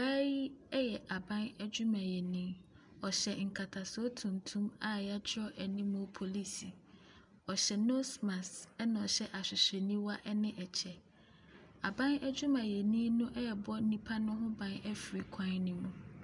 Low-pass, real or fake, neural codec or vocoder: 10.8 kHz; real; none